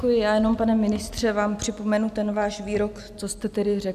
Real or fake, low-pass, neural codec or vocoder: real; 14.4 kHz; none